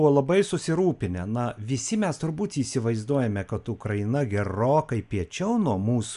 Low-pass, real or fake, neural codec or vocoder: 10.8 kHz; real; none